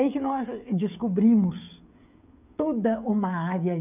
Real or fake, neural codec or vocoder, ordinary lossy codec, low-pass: fake; codec, 16 kHz, 16 kbps, FreqCodec, smaller model; none; 3.6 kHz